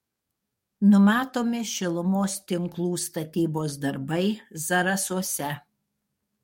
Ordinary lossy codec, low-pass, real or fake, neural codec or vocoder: MP3, 64 kbps; 19.8 kHz; fake; codec, 44.1 kHz, 7.8 kbps, DAC